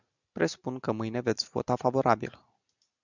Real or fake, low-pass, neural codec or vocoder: real; 7.2 kHz; none